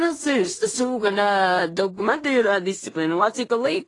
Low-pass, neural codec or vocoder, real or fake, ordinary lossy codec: 10.8 kHz; codec, 16 kHz in and 24 kHz out, 0.4 kbps, LongCat-Audio-Codec, two codebook decoder; fake; AAC, 32 kbps